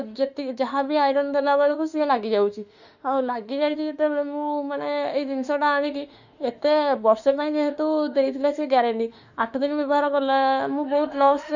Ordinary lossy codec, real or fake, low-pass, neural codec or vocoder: none; fake; 7.2 kHz; autoencoder, 48 kHz, 32 numbers a frame, DAC-VAE, trained on Japanese speech